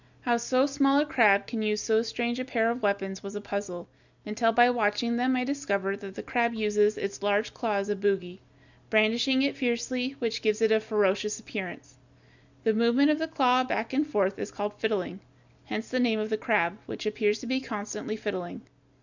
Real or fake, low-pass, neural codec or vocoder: real; 7.2 kHz; none